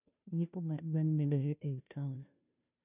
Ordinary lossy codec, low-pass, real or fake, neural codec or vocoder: none; 3.6 kHz; fake; codec, 16 kHz, 0.5 kbps, FunCodec, trained on Chinese and English, 25 frames a second